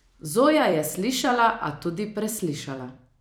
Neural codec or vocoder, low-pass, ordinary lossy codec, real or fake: vocoder, 44.1 kHz, 128 mel bands every 256 samples, BigVGAN v2; none; none; fake